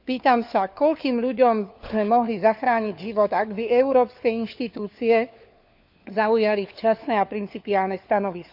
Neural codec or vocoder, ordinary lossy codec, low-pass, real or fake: codec, 16 kHz, 4 kbps, FunCodec, trained on LibriTTS, 50 frames a second; none; 5.4 kHz; fake